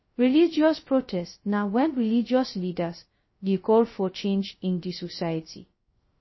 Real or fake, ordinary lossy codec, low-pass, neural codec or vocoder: fake; MP3, 24 kbps; 7.2 kHz; codec, 16 kHz, 0.2 kbps, FocalCodec